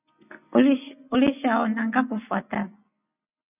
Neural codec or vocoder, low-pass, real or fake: none; 3.6 kHz; real